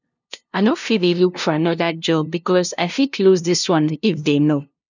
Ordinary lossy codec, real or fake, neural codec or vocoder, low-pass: none; fake; codec, 16 kHz, 0.5 kbps, FunCodec, trained on LibriTTS, 25 frames a second; 7.2 kHz